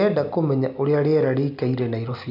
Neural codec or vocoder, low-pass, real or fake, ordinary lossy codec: none; 5.4 kHz; real; AAC, 48 kbps